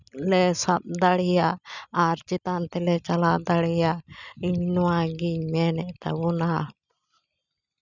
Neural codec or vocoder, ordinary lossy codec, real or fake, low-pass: none; none; real; 7.2 kHz